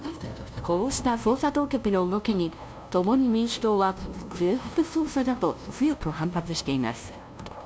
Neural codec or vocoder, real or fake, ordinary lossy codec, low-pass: codec, 16 kHz, 0.5 kbps, FunCodec, trained on LibriTTS, 25 frames a second; fake; none; none